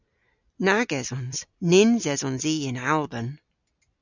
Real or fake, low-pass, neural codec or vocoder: real; 7.2 kHz; none